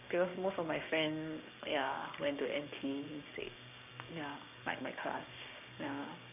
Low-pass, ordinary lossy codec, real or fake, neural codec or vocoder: 3.6 kHz; none; fake; codec, 16 kHz, 6 kbps, DAC